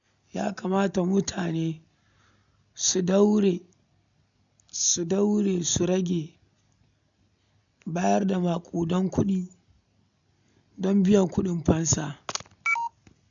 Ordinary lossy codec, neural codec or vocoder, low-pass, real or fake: none; none; 7.2 kHz; real